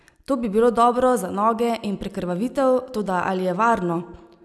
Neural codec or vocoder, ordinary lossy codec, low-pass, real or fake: none; none; none; real